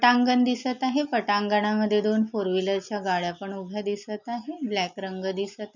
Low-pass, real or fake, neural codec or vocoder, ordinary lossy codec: 7.2 kHz; real; none; none